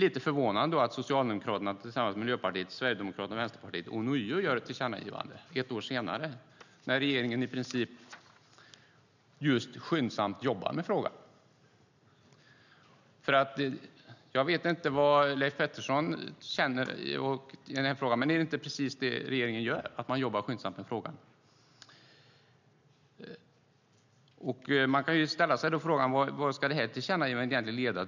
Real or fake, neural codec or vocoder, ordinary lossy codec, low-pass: real; none; none; 7.2 kHz